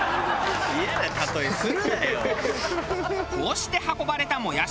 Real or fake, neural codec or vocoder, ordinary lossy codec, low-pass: real; none; none; none